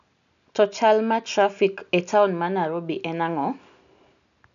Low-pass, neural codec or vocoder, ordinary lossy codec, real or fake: 7.2 kHz; none; none; real